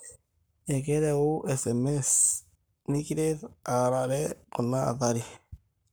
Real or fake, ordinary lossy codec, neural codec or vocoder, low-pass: fake; none; codec, 44.1 kHz, 7.8 kbps, Pupu-Codec; none